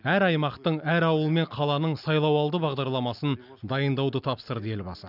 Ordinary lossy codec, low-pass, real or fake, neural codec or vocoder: none; 5.4 kHz; real; none